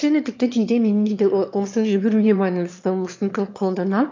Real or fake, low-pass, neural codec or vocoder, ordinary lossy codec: fake; 7.2 kHz; autoencoder, 22.05 kHz, a latent of 192 numbers a frame, VITS, trained on one speaker; MP3, 64 kbps